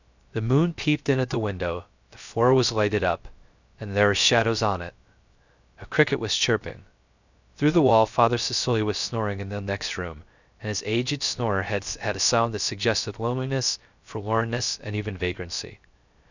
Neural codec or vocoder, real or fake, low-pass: codec, 16 kHz, 0.3 kbps, FocalCodec; fake; 7.2 kHz